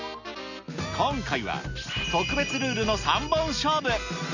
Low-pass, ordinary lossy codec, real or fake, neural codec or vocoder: 7.2 kHz; none; real; none